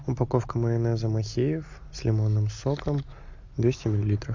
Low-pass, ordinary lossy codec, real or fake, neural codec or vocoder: 7.2 kHz; MP3, 64 kbps; real; none